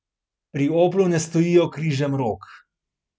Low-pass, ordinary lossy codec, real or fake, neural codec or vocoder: none; none; real; none